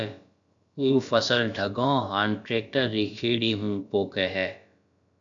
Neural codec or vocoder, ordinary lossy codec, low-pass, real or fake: codec, 16 kHz, about 1 kbps, DyCAST, with the encoder's durations; AAC, 64 kbps; 7.2 kHz; fake